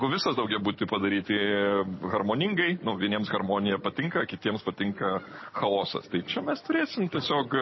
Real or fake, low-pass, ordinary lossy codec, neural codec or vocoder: real; 7.2 kHz; MP3, 24 kbps; none